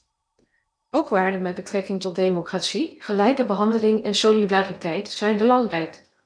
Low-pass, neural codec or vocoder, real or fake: 9.9 kHz; codec, 16 kHz in and 24 kHz out, 0.6 kbps, FocalCodec, streaming, 2048 codes; fake